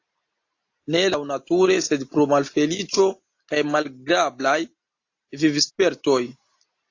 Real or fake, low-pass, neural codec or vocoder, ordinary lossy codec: real; 7.2 kHz; none; AAC, 48 kbps